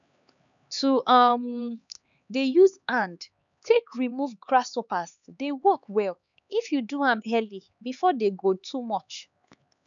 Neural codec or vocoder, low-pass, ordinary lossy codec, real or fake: codec, 16 kHz, 4 kbps, X-Codec, HuBERT features, trained on LibriSpeech; 7.2 kHz; none; fake